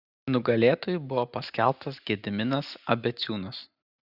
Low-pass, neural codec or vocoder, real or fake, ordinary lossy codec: 5.4 kHz; none; real; Opus, 64 kbps